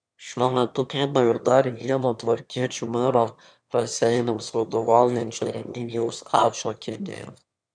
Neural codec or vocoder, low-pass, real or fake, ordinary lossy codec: autoencoder, 22.05 kHz, a latent of 192 numbers a frame, VITS, trained on one speaker; 9.9 kHz; fake; MP3, 96 kbps